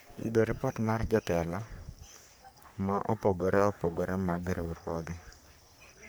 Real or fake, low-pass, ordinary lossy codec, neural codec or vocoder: fake; none; none; codec, 44.1 kHz, 3.4 kbps, Pupu-Codec